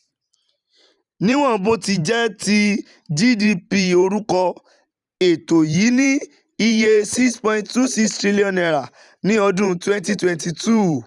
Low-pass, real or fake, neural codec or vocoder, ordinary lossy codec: 10.8 kHz; fake; vocoder, 44.1 kHz, 128 mel bands every 512 samples, BigVGAN v2; none